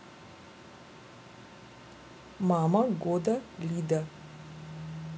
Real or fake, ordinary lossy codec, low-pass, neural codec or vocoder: real; none; none; none